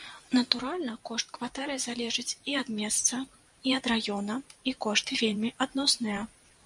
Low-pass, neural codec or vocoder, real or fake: 10.8 kHz; vocoder, 44.1 kHz, 128 mel bands every 512 samples, BigVGAN v2; fake